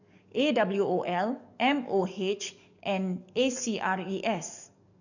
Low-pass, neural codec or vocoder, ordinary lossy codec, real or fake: 7.2 kHz; codec, 44.1 kHz, 7.8 kbps, DAC; none; fake